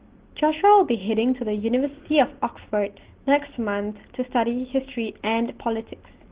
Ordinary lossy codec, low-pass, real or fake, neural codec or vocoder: Opus, 16 kbps; 3.6 kHz; real; none